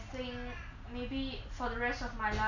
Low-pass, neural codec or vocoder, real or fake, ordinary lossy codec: 7.2 kHz; none; real; none